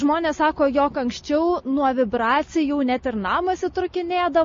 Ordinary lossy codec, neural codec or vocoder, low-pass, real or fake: MP3, 32 kbps; none; 7.2 kHz; real